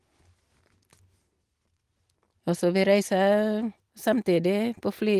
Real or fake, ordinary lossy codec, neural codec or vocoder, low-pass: real; Opus, 24 kbps; none; 14.4 kHz